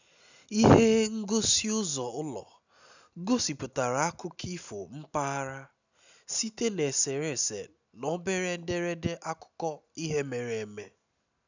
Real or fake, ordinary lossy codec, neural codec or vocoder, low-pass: real; none; none; 7.2 kHz